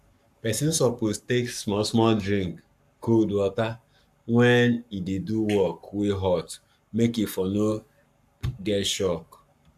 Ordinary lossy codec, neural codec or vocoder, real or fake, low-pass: AAC, 96 kbps; codec, 44.1 kHz, 7.8 kbps, Pupu-Codec; fake; 14.4 kHz